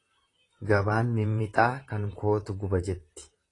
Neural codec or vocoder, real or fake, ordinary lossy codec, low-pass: vocoder, 44.1 kHz, 128 mel bands, Pupu-Vocoder; fake; AAC, 32 kbps; 10.8 kHz